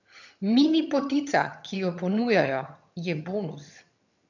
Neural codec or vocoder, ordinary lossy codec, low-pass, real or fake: vocoder, 22.05 kHz, 80 mel bands, HiFi-GAN; none; 7.2 kHz; fake